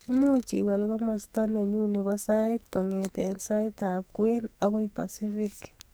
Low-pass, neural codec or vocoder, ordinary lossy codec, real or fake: none; codec, 44.1 kHz, 2.6 kbps, SNAC; none; fake